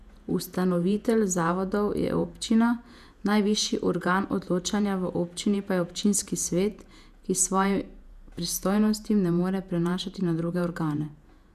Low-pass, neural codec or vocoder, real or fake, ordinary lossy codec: 14.4 kHz; vocoder, 48 kHz, 128 mel bands, Vocos; fake; none